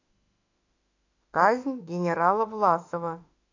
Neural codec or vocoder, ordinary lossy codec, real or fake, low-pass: autoencoder, 48 kHz, 32 numbers a frame, DAC-VAE, trained on Japanese speech; AAC, 48 kbps; fake; 7.2 kHz